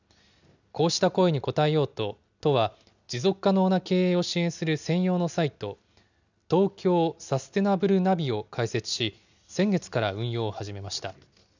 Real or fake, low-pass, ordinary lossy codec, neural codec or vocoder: real; 7.2 kHz; none; none